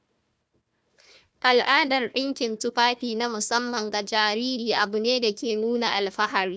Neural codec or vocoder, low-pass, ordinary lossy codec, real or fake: codec, 16 kHz, 1 kbps, FunCodec, trained on Chinese and English, 50 frames a second; none; none; fake